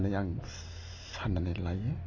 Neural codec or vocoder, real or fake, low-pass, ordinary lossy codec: none; real; 7.2 kHz; AAC, 32 kbps